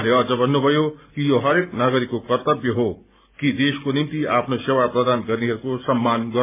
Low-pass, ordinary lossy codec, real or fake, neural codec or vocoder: 3.6 kHz; MP3, 32 kbps; real; none